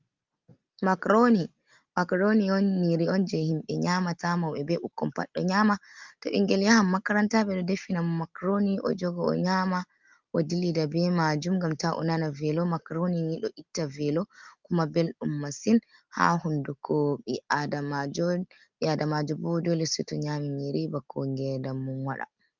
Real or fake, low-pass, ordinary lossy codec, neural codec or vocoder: real; 7.2 kHz; Opus, 32 kbps; none